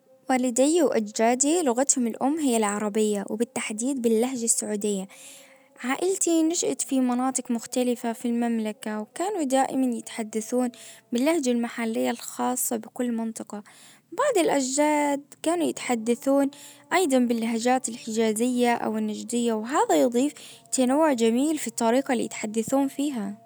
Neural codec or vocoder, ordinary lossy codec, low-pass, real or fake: none; none; none; real